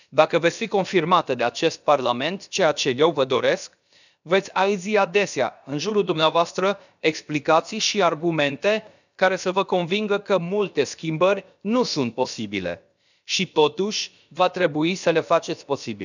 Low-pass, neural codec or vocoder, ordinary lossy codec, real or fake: 7.2 kHz; codec, 16 kHz, about 1 kbps, DyCAST, with the encoder's durations; none; fake